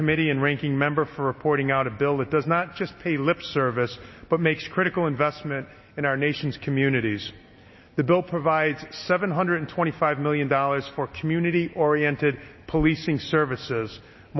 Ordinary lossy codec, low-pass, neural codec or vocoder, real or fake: MP3, 24 kbps; 7.2 kHz; none; real